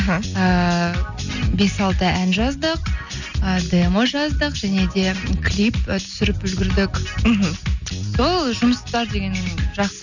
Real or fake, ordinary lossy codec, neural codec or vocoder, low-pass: real; none; none; 7.2 kHz